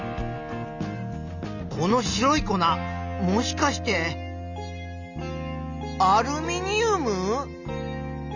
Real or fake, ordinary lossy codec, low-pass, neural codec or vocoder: real; none; 7.2 kHz; none